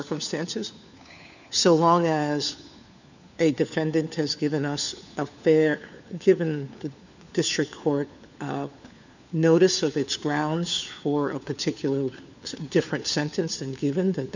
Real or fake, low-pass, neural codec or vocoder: fake; 7.2 kHz; codec, 16 kHz, 4 kbps, FunCodec, trained on LibriTTS, 50 frames a second